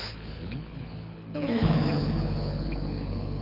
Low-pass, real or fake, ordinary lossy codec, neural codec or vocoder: 5.4 kHz; fake; none; codec, 24 kHz, 6 kbps, HILCodec